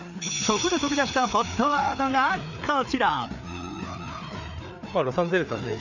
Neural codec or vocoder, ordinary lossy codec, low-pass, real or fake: codec, 16 kHz, 4 kbps, FreqCodec, larger model; none; 7.2 kHz; fake